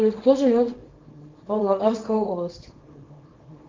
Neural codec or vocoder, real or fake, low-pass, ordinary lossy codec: codec, 24 kHz, 0.9 kbps, WavTokenizer, small release; fake; 7.2 kHz; Opus, 32 kbps